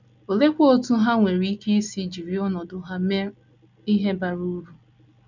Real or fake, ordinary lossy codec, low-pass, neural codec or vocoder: real; none; 7.2 kHz; none